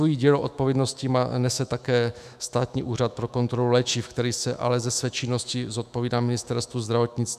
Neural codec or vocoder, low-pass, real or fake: autoencoder, 48 kHz, 128 numbers a frame, DAC-VAE, trained on Japanese speech; 14.4 kHz; fake